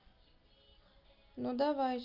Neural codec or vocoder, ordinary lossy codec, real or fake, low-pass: none; Opus, 24 kbps; real; 5.4 kHz